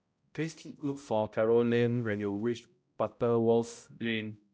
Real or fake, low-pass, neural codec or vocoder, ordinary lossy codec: fake; none; codec, 16 kHz, 0.5 kbps, X-Codec, HuBERT features, trained on balanced general audio; none